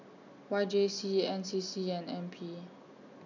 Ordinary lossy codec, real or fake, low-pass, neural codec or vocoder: none; real; 7.2 kHz; none